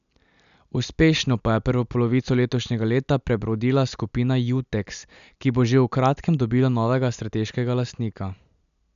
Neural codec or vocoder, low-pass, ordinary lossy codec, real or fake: none; 7.2 kHz; none; real